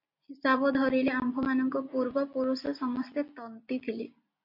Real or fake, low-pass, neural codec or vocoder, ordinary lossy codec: real; 5.4 kHz; none; MP3, 32 kbps